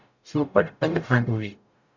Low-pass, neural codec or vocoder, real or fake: 7.2 kHz; codec, 44.1 kHz, 0.9 kbps, DAC; fake